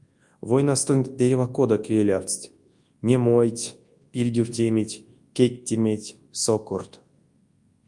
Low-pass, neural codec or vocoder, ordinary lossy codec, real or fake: 10.8 kHz; codec, 24 kHz, 0.9 kbps, WavTokenizer, large speech release; Opus, 32 kbps; fake